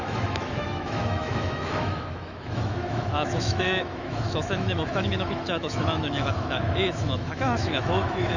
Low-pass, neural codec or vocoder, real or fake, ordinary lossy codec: 7.2 kHz; autoencoder, 48 kHz, 128 numbers a frame, DAC-VAE, trained on Japanese speech; fake; none